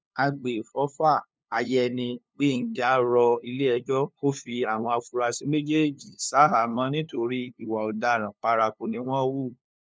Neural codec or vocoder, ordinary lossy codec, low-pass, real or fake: codec, 16 kHz, 2 kbps, FunCodec, trained on LibriTTS, 25 frames a second; none; none; fake